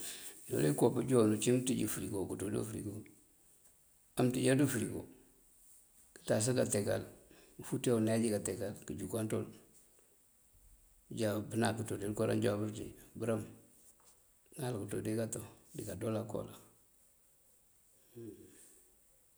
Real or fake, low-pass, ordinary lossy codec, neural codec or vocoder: real; none; none; none